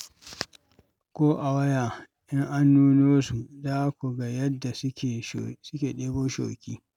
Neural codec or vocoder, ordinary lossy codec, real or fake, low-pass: none; none; real; 19.8 kHz